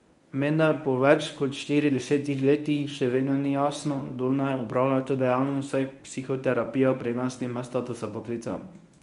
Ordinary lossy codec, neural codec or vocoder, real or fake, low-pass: none; codec, 24 kHz, 0.9 kbps, WavTokenizer, medium speech release version 1; fake; 10.8 kHz